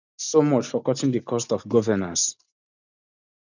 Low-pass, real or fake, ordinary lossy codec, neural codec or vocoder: 7.2 kHz; real; none; none